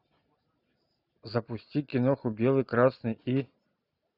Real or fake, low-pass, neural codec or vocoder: real; 5.4 kHz; none